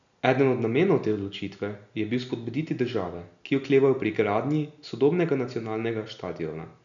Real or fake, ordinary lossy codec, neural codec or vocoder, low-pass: real; none; none; 7.2 kHz